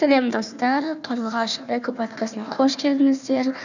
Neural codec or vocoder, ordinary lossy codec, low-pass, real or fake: codec, 16 kHz, 1 kbps, FunCodec, trained on Chinese and English, 50 frames a second; none; 7.2 kHz; fake